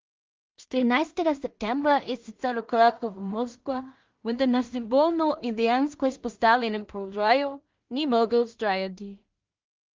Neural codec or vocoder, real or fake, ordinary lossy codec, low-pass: codec, 16 kHz in and 24 kHz out, 0.4 kbps, LongCat-Audio-Codec, two codebook decoder; fake; Opus, 32 kbps; 7.2 kHz